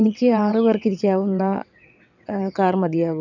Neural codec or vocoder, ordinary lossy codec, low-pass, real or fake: vocoder, 22.05 kHz, 80 mel bands, WaveNeXt; none; 7.2 kHz; fake